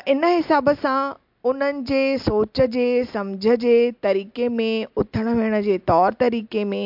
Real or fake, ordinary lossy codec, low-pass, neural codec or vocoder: real; MP3, 48 kbps; 5.4 kHz; none